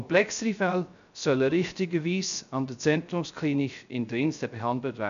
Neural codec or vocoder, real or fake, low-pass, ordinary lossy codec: codec, 16 kHz, 0.3 kbps, FocalCodec; fake; 7.2 kHz; none